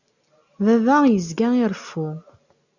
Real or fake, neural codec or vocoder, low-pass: real; none; 7.2 kHz